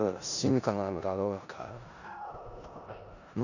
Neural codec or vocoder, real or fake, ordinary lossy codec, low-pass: codec, 16 kHz in and 24 kHz out, 0.4 kbps, LongCat-Audio-Codec, four codebook decoder; fake; none; 7.2 kHz